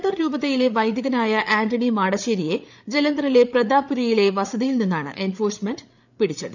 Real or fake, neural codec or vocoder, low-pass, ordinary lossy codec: fake; codec, 16 kHz, 8 kbps, FreqCodec, larger model; 7.2 kHz; none